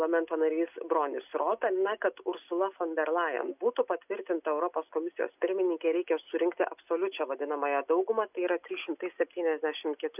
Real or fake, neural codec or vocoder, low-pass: real; none; 3.6 kHz